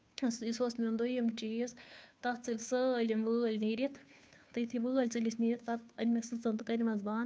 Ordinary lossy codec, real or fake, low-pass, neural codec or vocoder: none; fake; none; codec, 16 kHz, 2 kbps, FunCodec, trained on Chinese and English, 25 frames a second